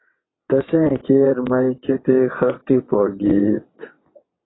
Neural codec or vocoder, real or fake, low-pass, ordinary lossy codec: vocoder, 22.05 kHz, 80 mel bands, WaveNeXt; fake; 7.2 kHz; AAC, 16 kbps